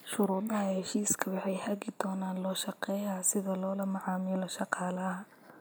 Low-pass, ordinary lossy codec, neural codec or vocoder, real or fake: none; none; none; real